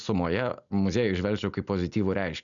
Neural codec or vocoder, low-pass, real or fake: none; 7.2 kHz; real